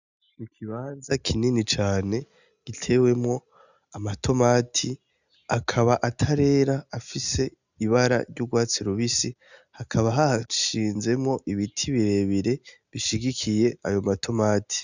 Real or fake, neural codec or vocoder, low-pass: real; none; 7.2 kHz